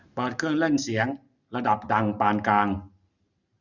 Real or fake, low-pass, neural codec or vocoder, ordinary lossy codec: real; 7.2 kHz; none; none